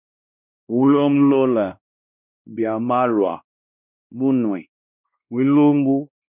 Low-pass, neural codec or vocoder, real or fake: 3.6 kHz; codec, 16 kHz, 1 kbps, X-Codec, WavLM features, trained on Multilingual LibriSpeech; fake